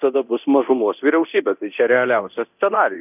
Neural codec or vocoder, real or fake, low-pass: codec, 24 kHz, 0.9 kbps, DualCodec; fake; 3.6 kHz